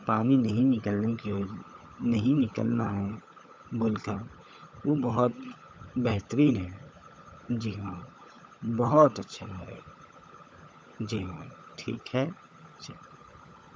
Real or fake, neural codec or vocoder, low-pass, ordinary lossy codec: fake; codec, 16 kHz, 16 kbps, FunCodec, trained on LibriTTS, 50 frames a second; none; none